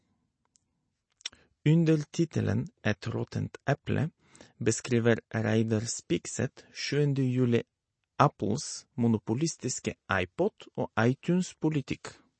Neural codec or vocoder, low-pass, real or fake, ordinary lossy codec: none; 9.9 kHz; real; MP3, 32 kbps